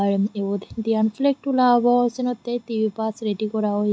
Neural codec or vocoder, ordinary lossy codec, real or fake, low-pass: none; none; real; none